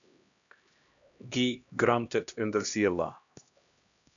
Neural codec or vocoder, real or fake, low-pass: codec, 16 kHz, 1 kbps, X-Codec, HuBERT features, trained on LibriSpeech; fake; 7.2 kHz